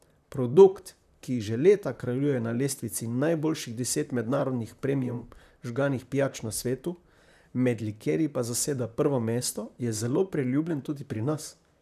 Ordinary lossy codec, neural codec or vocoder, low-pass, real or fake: none; vocoder, 44.1 kHz, 128 mel bands, Pupu-Vocoder; 14.4 kHz; fake